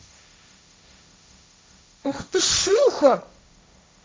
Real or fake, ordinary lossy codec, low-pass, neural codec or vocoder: fake; none; none; codec, 16 kHz, 1.1 kbps, Voila-Tokenizer